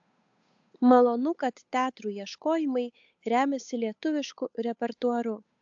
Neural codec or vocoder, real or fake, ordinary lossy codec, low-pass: codec, 16 kHz, 8 kbps, FunCodec, trained on Chinese and English, 25 frames a second; fake; AAC, 64 kbps; 7.2 kHz